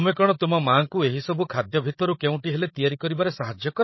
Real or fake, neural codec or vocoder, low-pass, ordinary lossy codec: fake; codec, 16 kHz, 16 kbps, FreqCodec, larger model; 7.2 kHz; MP3, 24 kbps